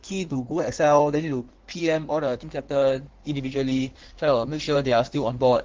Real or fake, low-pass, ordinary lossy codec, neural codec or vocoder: fake; 7.2 kHz; Opus, 16 kbps; codec, 16 kHz in and 24 kHz out, 1.1 kbps, FireRedTTS-2 codec